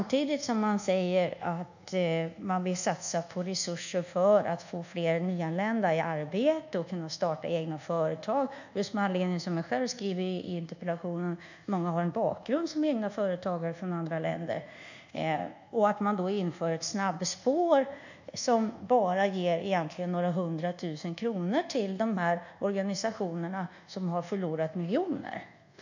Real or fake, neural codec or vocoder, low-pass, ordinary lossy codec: fake; codec, 24 kHz, 1.2 kbps, DualCodec; 7.2 kHz; none